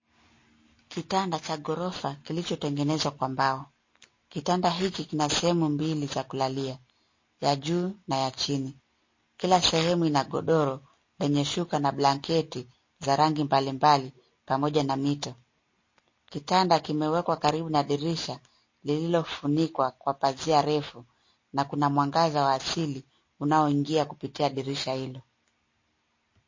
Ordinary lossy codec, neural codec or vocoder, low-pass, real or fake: MP3, 32 kbps; none; 7.2 kHz; real